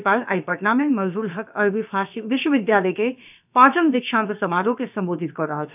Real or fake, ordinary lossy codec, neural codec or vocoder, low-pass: fake; none; codec, 16 kHz, about 1 kbps, DyCAST, with the encoder's durations; 3.6 kHz